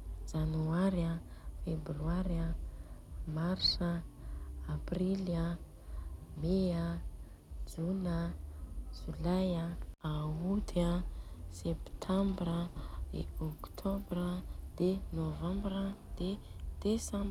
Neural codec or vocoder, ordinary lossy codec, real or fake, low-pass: none; none; real; 19.8 kHz